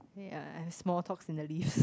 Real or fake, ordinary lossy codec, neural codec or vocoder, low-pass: real; none; none; none